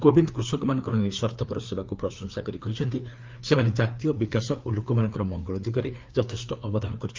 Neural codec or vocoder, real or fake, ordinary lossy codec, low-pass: codec, 16 kHz, 4 kbps, FreqCodec, larger model; fake; Opus, 32 kbps; 7.2 kHz